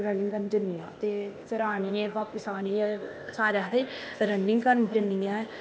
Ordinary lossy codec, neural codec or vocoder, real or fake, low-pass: none; codec, 16 kHz, 0.8 kbps, ZipCodec; fake; none